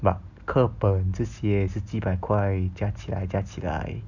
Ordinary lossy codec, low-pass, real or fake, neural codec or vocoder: none; 7.2 kHz; real; none